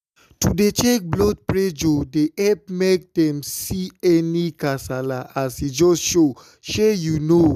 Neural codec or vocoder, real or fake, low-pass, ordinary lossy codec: none; real; 14.4 kHz; none